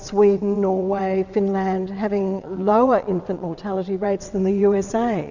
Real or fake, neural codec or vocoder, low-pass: fake; vocoder, 22.05 kHz, 80 mel bands, WaveNeXt; 7.2 kHz